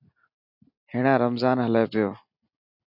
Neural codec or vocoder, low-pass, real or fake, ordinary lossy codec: none; 5.4 kHz; real; AAC, 48 kbps